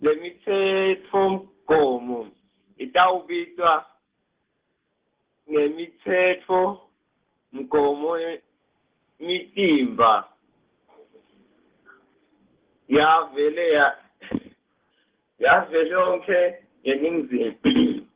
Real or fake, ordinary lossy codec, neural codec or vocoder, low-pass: real; Opus, 16 kbps; none; 3.6 kHz